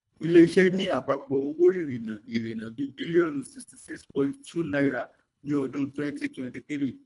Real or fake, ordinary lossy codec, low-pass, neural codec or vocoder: fake; none; 10.8 kHz; codec, 24 kHz, 1.5 kbps, HILCodec